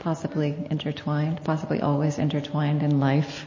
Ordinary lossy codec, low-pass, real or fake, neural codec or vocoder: MP3, 32 kbps; 7.2 kHz; real; none